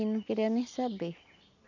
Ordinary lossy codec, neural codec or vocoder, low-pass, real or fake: none; none; 7.2 kHz; real